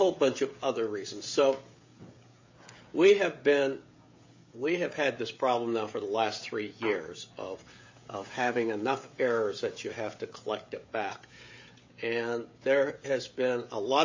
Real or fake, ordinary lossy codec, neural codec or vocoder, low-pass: fake; MP3, 32 kbps; codec, 16 kHz, 16 kbps, FreqCodec, smaller model; 7.2 kHz